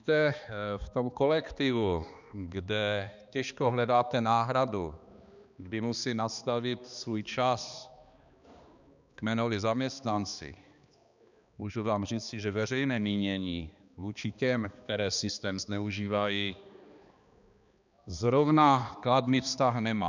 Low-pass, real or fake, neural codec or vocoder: 7.2 kHz; fake; codec, 16 kHz, 2 kbps, X-Codec, HuBERT features, trained on balanced general audio